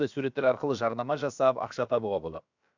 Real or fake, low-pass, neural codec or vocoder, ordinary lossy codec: fake; 7.2 kHz; codec, 16 kHz, about 1 kbps, DyCAST, with the encoder's durations; none